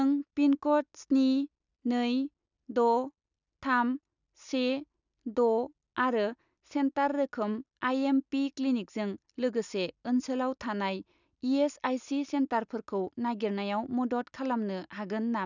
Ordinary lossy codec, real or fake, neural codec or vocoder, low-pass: none; real; none; 7.2 kHz